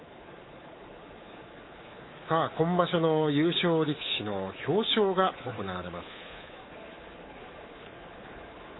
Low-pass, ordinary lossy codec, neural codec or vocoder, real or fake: 7.2 kHz; AAC, 16 kbps; codec, 24 kHz, 3.1 kbps, DualCodec; fake